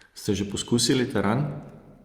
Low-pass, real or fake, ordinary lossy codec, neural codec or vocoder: 19.8 kHz; real; Opus, 32 kbps; none